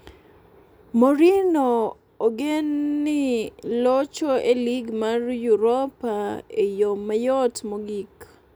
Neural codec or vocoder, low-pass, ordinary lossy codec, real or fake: none; none; none; real